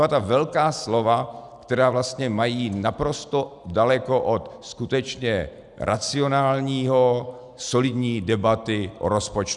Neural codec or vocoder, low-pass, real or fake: none; 10.8 kHz; real